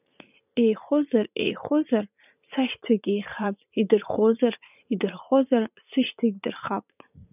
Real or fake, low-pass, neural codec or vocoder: fake; 3.6 kHz; vocoder, 44.1 kHz, 80 mel bands, Vocos